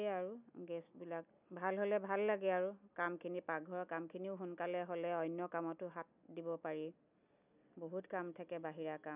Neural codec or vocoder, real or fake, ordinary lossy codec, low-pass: none; real; none; 3.6 kHz